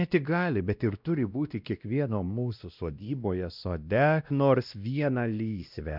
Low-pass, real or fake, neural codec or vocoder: 5.4 kHz; fake; codec, 16 kHz, 1 kbps, X-Codec, WavLM features, trained on Multilingual LibriSpeech